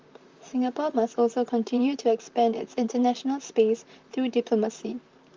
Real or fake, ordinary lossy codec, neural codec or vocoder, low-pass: fake; Opus, 32 kbps; vocoder, 44.1 kHz, 128 mel bands, Pupu-Vocoder; 7.2 kHz